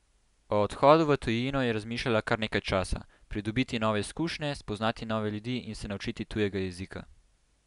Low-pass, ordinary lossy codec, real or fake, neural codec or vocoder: 10.8 kHz; none; real; none